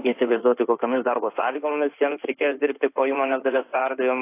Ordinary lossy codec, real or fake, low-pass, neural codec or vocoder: AAC, 24 kbps; fake; 3.6 kHz; codec, 16 kHz in and 24 kHz out, 2.2 kbps, FireRedTTS-2 codec